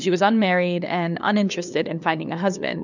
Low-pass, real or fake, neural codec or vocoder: 7.2 kHz; fake; codec, 16 kHz, 2 kbps, FunCodec, trained on LibriTTS, 25 frames a second